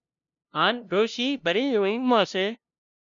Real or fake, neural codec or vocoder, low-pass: fake; codec, 16 kHz, 0.5 kbps, FunCodec, trained on LibriTTS, 25 frames a second; 7.2 kHz